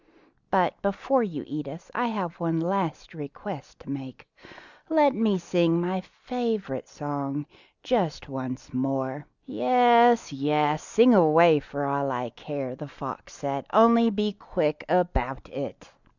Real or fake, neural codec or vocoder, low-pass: real; none; 7.2 kHz